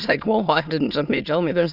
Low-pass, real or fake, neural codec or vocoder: 5.4 kHz; fake; autoencoder, 22.05 kHz, a latent of 192 numbers a frame, VITS, trained on many speakers